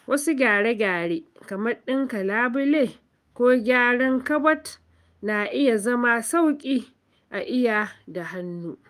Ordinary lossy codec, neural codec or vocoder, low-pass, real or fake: Opus, 32 kbps; autoencoder, 48 kHz, 128 numbers a frame, DAC-VAE, trained on Japanese speech; 14.4 kHz; fake